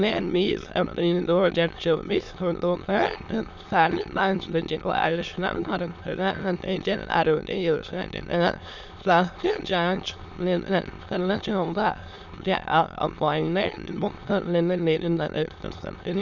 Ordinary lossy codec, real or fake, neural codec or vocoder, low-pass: none; fake; autoencoder, 22.05 kHz, a latent of 192 numbers a frame, VITS, trained on many speakers; 7.2 kHz